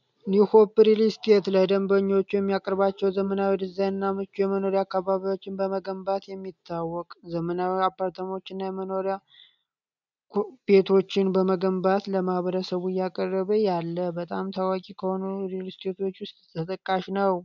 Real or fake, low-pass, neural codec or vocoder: real; 7.2 kHz; none